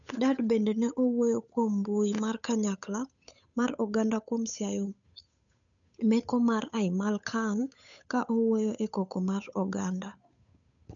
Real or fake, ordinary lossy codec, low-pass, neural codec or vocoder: fake; none; 7.2 kHz; codec, 16 kHz, 8 kbps, FunCodec, trained on Chinese and English, 25 frames a second